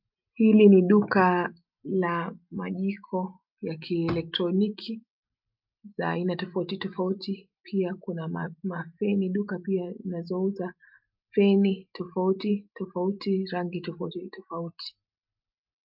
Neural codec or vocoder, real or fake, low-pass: none; real; 5.4 kHz